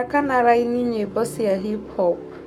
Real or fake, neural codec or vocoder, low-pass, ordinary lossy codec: fake; codec, 44.1 kHz, 7.8 kbps, Pupu-Codec; 19.8 kHz; none